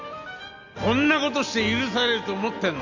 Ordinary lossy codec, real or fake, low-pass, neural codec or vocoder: none; real; 7.2 kHz; none